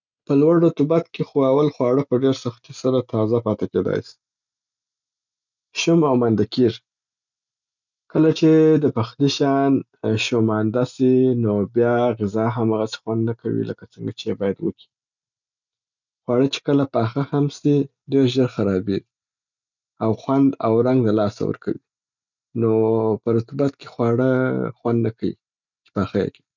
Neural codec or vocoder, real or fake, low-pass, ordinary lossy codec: none; real; 7.2 kHz; none